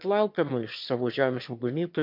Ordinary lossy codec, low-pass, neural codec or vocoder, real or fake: MP3, 48 kbps; 5.4 kHz; autoencoder, 22.05 kHz, a latent of 192 numbers a frame, VITS, trained on one speaker; fake